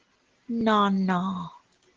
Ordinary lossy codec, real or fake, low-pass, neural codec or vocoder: Opus, 16 kbps; real; 7.2 kHz; none